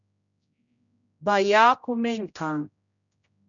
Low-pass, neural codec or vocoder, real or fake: 7.2 kHz; codec, 16 kHz, 0.5 kbps, X-Codec, HuBERT features, trained on general audio; fake